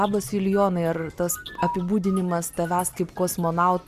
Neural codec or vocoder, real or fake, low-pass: none; real; 14.4 kHz